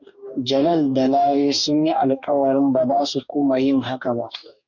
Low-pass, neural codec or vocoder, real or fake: 7.2 kHz; codec, 44.1 kHz, 2.6 kbps, DAC; fake